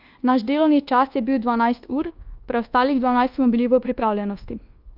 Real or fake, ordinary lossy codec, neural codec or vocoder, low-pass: fake; Opus, 32 kbps; codec, 24 kHz, 1.2 kbps, DualCodec; 5.4 kHz